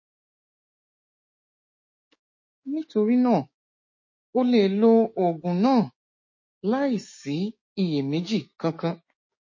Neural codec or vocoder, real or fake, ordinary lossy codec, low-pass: codec, 16 kHz, 6 kbps, DAC; fake; MP3, 32 kbps; 7.2 kHz